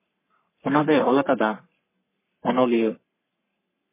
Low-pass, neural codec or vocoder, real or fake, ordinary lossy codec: 3.6 kHz; codec, 44.1 kHz, 3.4 kbps, Pupu-Codec; fake; MP3, 16 kbps